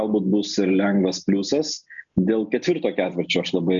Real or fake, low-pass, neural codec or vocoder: real; 7.2 kHz; none